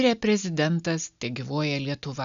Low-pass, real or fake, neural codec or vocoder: 7.2 kHz; real; none